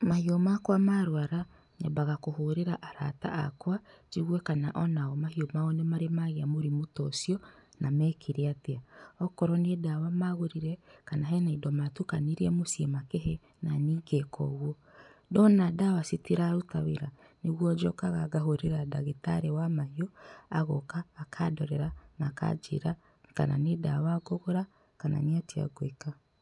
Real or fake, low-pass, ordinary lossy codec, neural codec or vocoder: real; 10.8 kHz; none; none